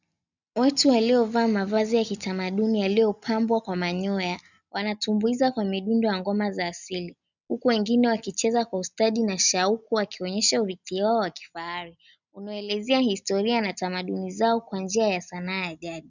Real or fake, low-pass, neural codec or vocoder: real; 7.2 kHz; none